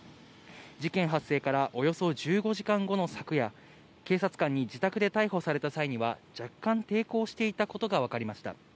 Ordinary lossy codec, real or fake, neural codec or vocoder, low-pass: none; real; none; none